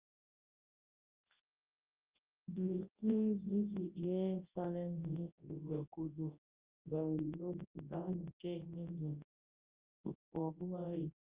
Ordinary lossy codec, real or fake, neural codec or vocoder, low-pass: Opus, 24 kbps; fake; codec, 24 kHz, 0.9 kbps, WavTokenizer, large speech release; 3.6 kHz